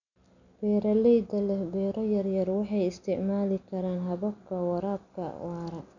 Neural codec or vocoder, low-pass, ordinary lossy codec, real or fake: none; 7.2 kHz; none; real